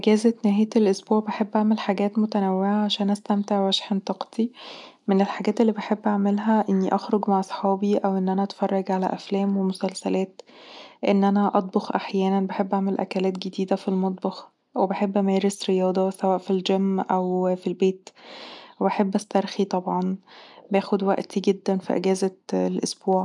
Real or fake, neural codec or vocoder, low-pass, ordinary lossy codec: real; none; 10.8 kHz; none